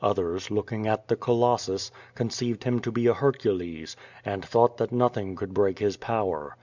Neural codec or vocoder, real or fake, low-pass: none; real; 7.2 kHz